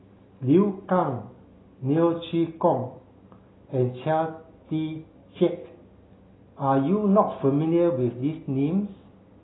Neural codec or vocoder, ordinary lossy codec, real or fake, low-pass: none; AAC, 16 kbps; real; 7.2 kHz